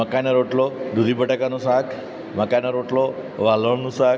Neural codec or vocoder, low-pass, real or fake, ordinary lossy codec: none; none; real; none